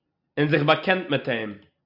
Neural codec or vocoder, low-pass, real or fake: none; 5.4 kHz; real